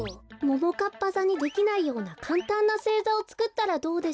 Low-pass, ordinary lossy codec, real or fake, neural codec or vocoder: none; none; real; none